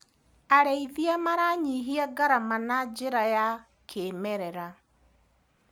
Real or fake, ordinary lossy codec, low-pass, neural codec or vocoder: real; none; none; none